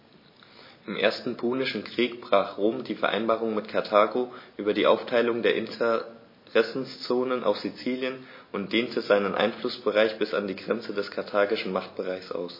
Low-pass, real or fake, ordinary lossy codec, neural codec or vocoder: 5.4 kHz; real; MP3, 24 kbps; none